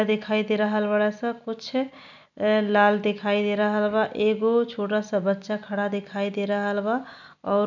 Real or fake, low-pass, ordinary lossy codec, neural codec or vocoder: real; 7.2 kHz; none; none